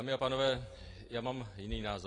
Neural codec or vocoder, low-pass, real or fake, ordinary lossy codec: none; 10.8 kHz; real; AAC, 32 kbps